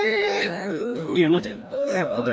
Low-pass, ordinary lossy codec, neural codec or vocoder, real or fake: none; none; codec, 16 kHz, 1 kbps, FreqCodec, larger model; fake